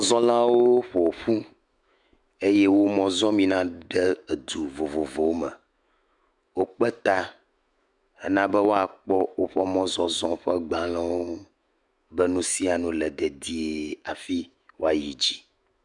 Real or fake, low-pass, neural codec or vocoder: fake; 10.8 kHz; autoencoder, 48 kHz, 128 numbers a frame, DAC-VAE, trained on Japanese speech